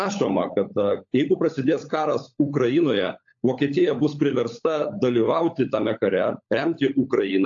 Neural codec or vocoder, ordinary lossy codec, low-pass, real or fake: codec, 16 kHz, 16 kbps, FunCodec, trained on LibriTTS, 50 frames a second; AAC, 48 kbps; 7.2 kHz; fake